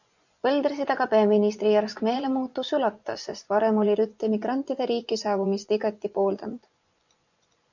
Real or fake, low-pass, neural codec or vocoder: fake; 7.2 kHz; vocoder, 24 kHz, 100 mel bands, Vocos